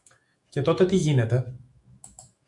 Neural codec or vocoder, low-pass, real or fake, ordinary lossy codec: autoencoder, 48 kHz, 128 numbers a frame, DAC-VAE, trained on Japanese speech; 10.8 kHz; fake; MP3, 64 kbps